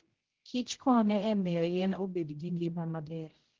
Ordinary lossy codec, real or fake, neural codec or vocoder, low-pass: Opus, 16 kbps; fake; codec, 16 kHz, 0.5 kbps, X-Codec, HuBERT features, trained on general audio; 7.2 kHz